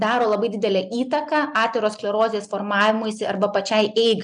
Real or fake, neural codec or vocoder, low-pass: real; none; 9.9 kHz